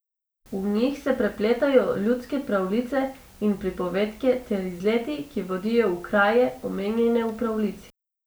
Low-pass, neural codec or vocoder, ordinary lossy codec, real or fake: none; none; none; real